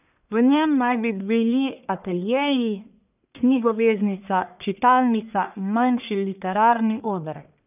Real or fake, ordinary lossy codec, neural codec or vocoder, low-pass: fake; none; codec, 44.1 kHz, 1.7 kbps, Pupu-Codec; 3.6 kHz